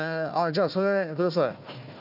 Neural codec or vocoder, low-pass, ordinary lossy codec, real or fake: codec, 16 kHz, 1 kbps, FunCodec, trained on Chinese and English, 50 frames a second; 5.4 kHz; none; fake